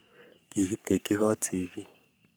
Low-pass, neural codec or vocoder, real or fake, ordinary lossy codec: none; codec, 44.1 kHz, 3.4 kbps, Pupu-Codec; fake; none